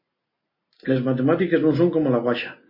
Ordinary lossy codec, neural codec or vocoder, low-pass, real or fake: MP3, 24 kbps; none; 5.4 kHz; real